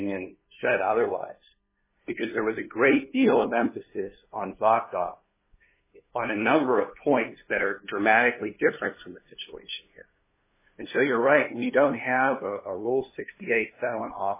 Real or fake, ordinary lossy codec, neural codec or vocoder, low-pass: fake; MP3, 16 kbps; codec, 16 kHz, 2 kbps, FunCodec, trained on LibriTTS, 25 frames a second; 3.6 kHz